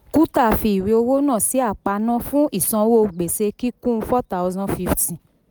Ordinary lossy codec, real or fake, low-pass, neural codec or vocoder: none; real; none; none